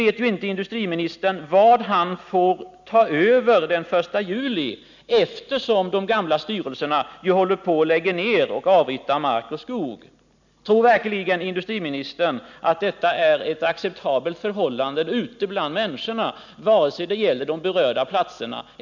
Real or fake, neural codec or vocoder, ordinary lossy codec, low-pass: real; none; none; 7.2 kHz